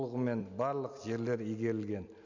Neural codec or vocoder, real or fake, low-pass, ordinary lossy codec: none; real; 7.2 kHz; none